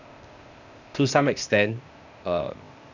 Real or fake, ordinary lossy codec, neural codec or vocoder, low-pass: fake; none; codec, 16 kHz, 0.8 kbps, ZipCodec; 7.2 kHz